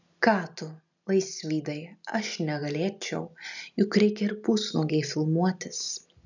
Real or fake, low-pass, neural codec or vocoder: real; 7.2 kHz; none